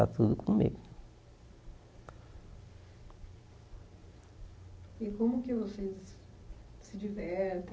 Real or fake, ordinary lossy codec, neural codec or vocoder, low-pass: real; none; none; none